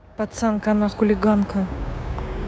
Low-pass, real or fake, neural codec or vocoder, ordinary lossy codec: none; fake; codec, 16 kHz, 6 kbps, DAC; none